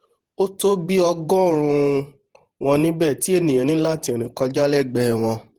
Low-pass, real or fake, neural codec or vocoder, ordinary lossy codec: 19.8 kHz; fake; vocoder, 48 kHz, 128 mel bands, Vocos; Opus, 16 kbps